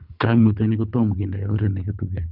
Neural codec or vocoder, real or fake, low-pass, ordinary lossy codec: codec, 24 kHz, 3 kbps, HILCodec; fake; 5.4 kHz; none